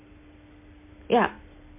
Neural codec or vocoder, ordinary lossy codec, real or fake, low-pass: none; MP3, 24 kbps; real; 3.6 kHz